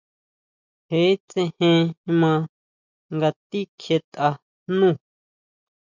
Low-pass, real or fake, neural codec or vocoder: 7.2 kHz; real; none